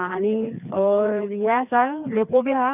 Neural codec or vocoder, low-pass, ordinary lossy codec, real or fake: codec, 16 kHz, 2 kbps, FreqCodec, larger model; 3.6 kHz; none; fake